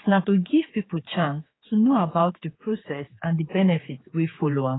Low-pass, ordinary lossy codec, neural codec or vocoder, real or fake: 7.2 kHz; AAC, 16 kbps; codec, 16 kHz, 4 kbps, X-Codec, HuBERT features, trained on general audio; fake